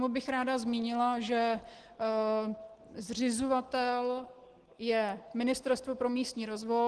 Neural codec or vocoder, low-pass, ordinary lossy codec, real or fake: none; 10.8 kHz; Opus, 16 kbps; real